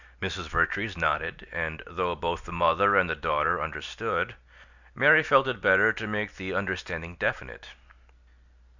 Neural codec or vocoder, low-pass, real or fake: none; 7.2 kHz; real